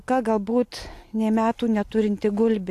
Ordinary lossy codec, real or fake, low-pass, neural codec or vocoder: AAC, 64 kbps; real; 14.4 kHz; none